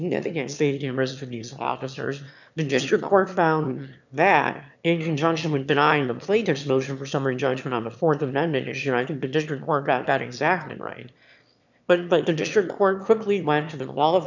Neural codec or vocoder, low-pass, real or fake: autoencoder, 22.05 kHz, a latent of 192 numbers a frame, VITS, trained on one speaker; 7.2 kHz; fake